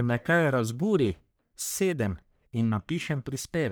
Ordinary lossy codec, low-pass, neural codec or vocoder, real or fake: none; none; codec, 44.1 kHz, 1.7 kbps, Pupu-Codec; fake